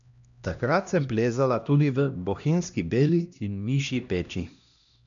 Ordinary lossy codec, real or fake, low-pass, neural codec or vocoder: none; fake; 7.2 kHz; codec, 16 kHz, 1 kbps, X-Codec, HuBERT features, trained on LibriSpeech